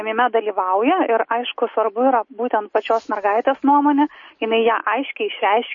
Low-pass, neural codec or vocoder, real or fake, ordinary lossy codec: 7.2 kHz; none; real; MP3, 32 kbps